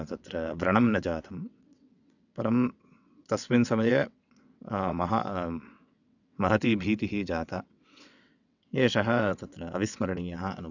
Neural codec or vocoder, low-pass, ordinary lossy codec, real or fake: vocoder, 22.05 kHz, 80 mel bands, WaveNeXt; 7.2 kHz; none; fake